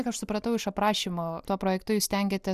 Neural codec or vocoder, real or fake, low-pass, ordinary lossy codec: none; real; 14.4 kHz; Opus, 64 kbps